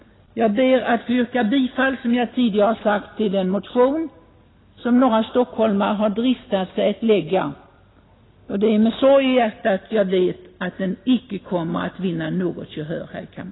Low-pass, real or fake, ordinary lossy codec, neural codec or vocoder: 7.2 kHz; real; AAC, 16 kbps; none